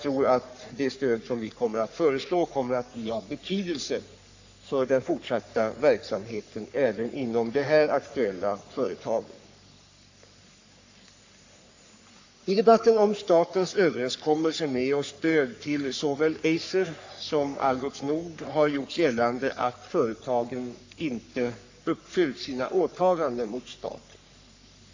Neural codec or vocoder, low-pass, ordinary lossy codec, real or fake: codec, 44.1 kHz, 3.4 kbps, Pupu-Codec; 7.2 kHz; AAC, 48 kbps; fake